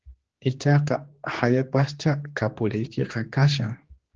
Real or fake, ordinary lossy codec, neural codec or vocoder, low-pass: fake; Opus, 16 kbps; codec, 16 kHz, 2 kbps, X-Codec, HuBERT features, trained on general audio; 7.2 kHz